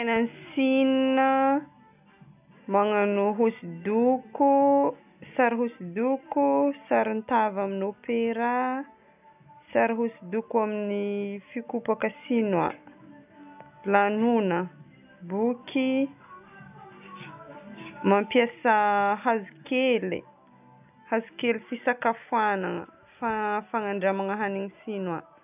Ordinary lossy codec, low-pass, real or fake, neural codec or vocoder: none; 3.6 kHz; real; none